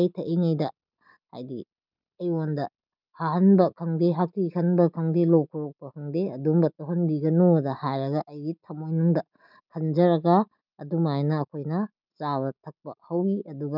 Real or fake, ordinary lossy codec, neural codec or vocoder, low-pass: real; none; none; 5.4 kHz